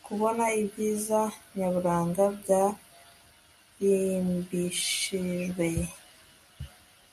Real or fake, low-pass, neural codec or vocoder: real; 14.4 kHz; none